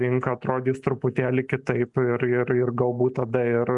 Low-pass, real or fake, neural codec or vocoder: 10.8 kHz; real; none